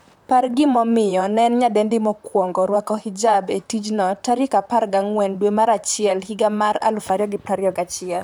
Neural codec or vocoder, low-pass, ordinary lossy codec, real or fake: vocoder, 44.1 kHz, 128 mel bands, Pupu-Vocoder; none; none; fake